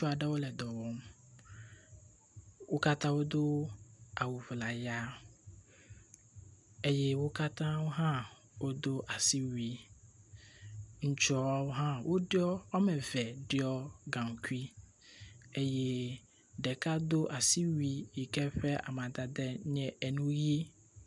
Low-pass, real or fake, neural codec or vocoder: 10.8 kHz; real; none